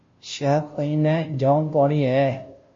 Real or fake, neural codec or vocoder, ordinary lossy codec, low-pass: fake; codec, 16 kHz, 0.5 kbps, FunCodec, trained on Chinese and English, 25 frames a second; MP3, 32 kbps; 7.2 kHz